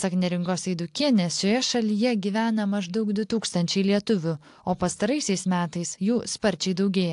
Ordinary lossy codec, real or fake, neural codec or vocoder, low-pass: AAC, 64 kbps; real; none; 10.8 kHz